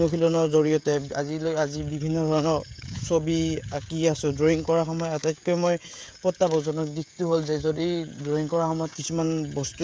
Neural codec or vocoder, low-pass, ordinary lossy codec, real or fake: codec, 16 kHz, 16 kbps, FreqCodec, smaller model; none; none; fake